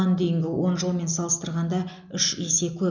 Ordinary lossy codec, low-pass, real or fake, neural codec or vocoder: none; 7.2 kHz; real; none